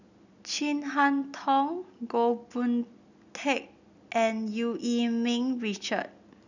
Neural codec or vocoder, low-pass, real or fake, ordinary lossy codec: none; 7.2 kHz; real; none